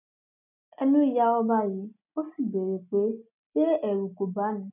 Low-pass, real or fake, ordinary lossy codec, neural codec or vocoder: 3.6 kHz; real; none; none